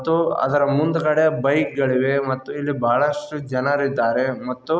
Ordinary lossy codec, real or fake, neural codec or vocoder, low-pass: none; real; none; none